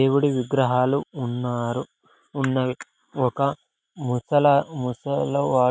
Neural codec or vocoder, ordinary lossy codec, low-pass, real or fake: none; none; none; real